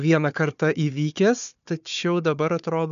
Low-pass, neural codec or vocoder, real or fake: 7.2 kHz; codec, 16 kHz, 4 kbps, FunCodec, trained on Chinese and English, 50 frames a second; fake